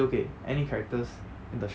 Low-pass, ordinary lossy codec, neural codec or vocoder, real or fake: none; none; none; real